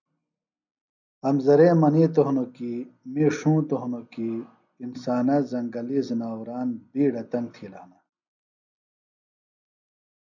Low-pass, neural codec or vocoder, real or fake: 7.2 kHz; none; real